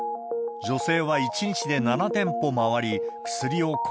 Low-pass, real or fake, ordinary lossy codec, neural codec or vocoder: none; real; none; none